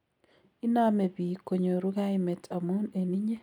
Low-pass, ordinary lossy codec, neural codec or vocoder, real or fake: 19.8 kHz; none; none; real